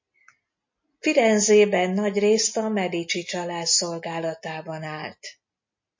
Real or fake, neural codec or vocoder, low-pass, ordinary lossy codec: real; none; 7.2 kHz; MP3, 32 kbps